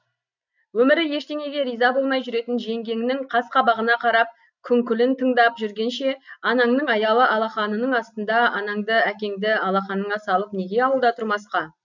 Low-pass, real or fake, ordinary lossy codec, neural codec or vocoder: 7.2 kHz; real; none; none